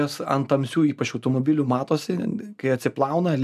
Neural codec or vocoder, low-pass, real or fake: none; 14.4 kHz; real